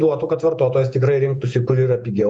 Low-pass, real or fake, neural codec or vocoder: 9.9 kHz; fake; vocoder, 48 kHz, 128 mel bands, Vocos